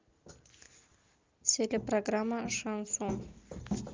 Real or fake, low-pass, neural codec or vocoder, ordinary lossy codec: real; 7.2 kHz; none; Opus, 24 kbps